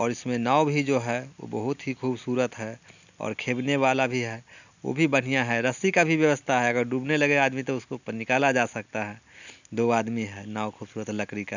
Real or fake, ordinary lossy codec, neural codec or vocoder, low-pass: real; none; none; 7.2 kHz